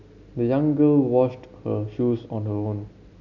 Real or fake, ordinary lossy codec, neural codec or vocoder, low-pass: real; none; none; 7.2 kHz